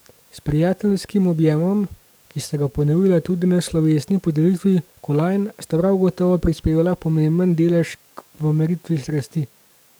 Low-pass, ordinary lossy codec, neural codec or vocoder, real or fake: none; none; codec, 44.1 kHz, 7.8 kbps, DAC; fake